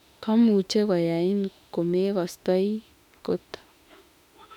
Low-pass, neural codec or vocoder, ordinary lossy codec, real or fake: 19.8 kHz; autoencoder, 48 kHz, 32 numbers a frame, DAC-VAE, trained on Japanese speech; none; fake